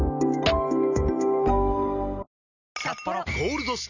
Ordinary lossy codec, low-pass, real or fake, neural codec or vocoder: none; 7.2 kHz; real; none